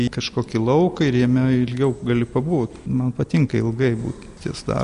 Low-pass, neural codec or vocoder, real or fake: 10.8 kHz; none; real